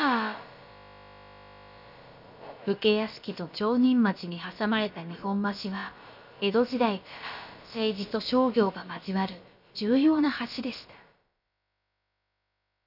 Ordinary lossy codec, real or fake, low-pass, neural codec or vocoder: none; fake; 5.4 kHz; codec, 16 kHz, about 1 kbps, DyCAST, with the encoder's durations